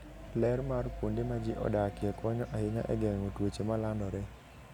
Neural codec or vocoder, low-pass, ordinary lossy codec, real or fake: none; 19.8 kHz; none; real